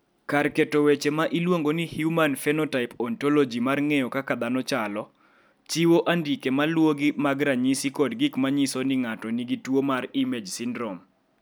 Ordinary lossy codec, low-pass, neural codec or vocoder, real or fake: none; none; none; real